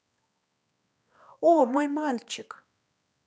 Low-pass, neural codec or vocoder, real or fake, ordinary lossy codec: none; codec, 16 kHz, 2 kbps, X-Codec, HuBERT features, trained on balanced general audio; fake; none